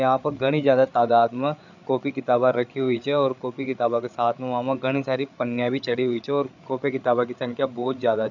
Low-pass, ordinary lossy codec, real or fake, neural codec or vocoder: 7.2 kHz; none; fake; codec, 16 kHz, 8 kbps, FreqCodec, larger model